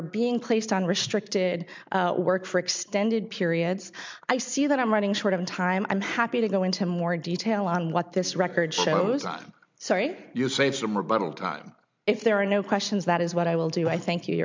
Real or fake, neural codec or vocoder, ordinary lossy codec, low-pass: real; none; MP3, 64 kbps; 7.2 kHz